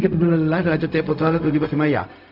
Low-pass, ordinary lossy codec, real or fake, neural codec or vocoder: 5.4 kHz; none; fake; codec, 16 kHz, 0.4 kbps, LongCat-Audio-Codec